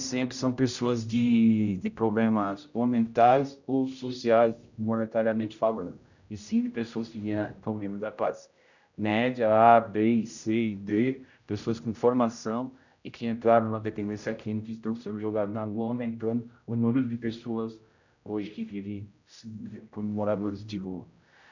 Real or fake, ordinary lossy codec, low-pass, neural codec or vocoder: fake; none; 7.2 kHz; codec, 16 kHz, 0.5 kbps, X-Codec, HuBERT features, trained on general audio